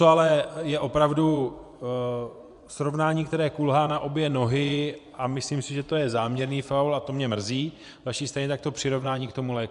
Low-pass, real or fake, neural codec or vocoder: 10.8 kHz; fake; vocoder, 24 kHz, 100 mel bands, Vocos